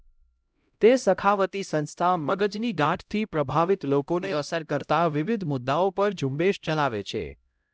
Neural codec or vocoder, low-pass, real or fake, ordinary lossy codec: codec, 16 kHz, 0.5 kbps, X-Codec, HuBERT features, trained on LibriSpeech; none; fake; none